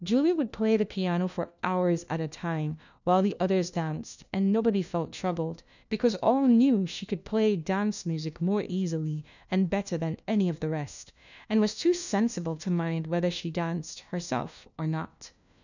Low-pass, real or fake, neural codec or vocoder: 7.2 kHz; fake; codec, 16 kHz, 1 kbps, FunCodec, trained on LibriTTS, 50 frames a second